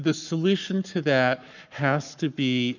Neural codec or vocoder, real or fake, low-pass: codec, 44.1 kHz, 7.8 kbps, Pupu-Codec; fake; 7.2 kHz